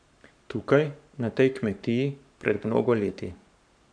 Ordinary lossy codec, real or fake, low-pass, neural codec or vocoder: none; fake; 9.9 kHz; codec, 44.1 kHz, 7.8 kbps, Pupu-Codec